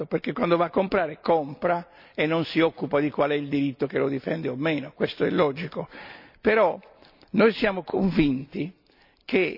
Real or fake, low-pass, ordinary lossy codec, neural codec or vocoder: real; 5.4 kHz; none; none